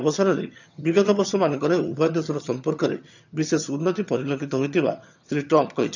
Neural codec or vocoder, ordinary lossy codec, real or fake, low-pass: vocoder, 22.05 kHz, 80 mel bands, HiFi-GAN; none; fake; 7.2 kHz